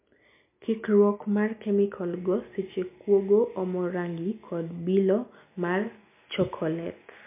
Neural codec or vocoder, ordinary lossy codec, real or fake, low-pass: none; MP3, 32 kbps; real; 3.6 kHz